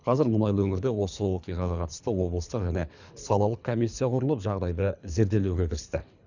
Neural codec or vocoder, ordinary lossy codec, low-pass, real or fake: codec, 24 kHz, 3 kbps, HILCodec; none; 7.2 kHz; fake